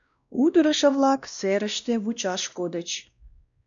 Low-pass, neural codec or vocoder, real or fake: 7.2 kHz; codec, 16 kHz, 1 kbps, X-Codec, WavLM features, trained on Multilingual LibriSpeech; fake